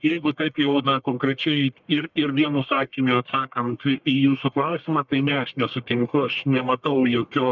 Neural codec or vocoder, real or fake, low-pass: codec, 44.1 kHz, 1.7 kbps, Pupu-Codec; fake; 7.2 kHz